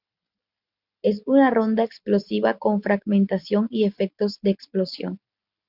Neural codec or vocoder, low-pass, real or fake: none; 5.4 kHz; real